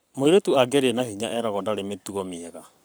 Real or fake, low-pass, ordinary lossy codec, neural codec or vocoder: fake; none; none; codec, 44.1 kHz, 7.8 kbps, Pupu-Codec